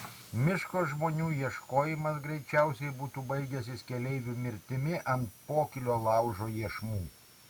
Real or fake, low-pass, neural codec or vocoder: real; 19.8 kHz; none